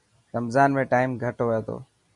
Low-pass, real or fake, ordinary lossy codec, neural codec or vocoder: 10.8 kHz; real; MP3, 96 kbps; none